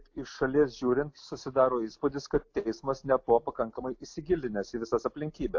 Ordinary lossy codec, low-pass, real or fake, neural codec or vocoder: AAC, 48 kbps; 7.2 kHz; real; none